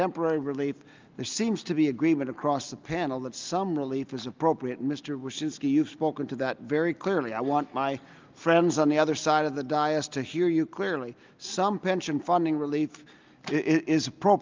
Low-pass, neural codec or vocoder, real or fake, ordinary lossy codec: 7.2 kHz; none; real; Opus, 24 kbps